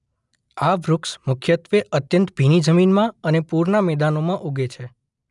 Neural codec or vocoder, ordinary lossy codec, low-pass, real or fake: none; none; 10.8 kHz; real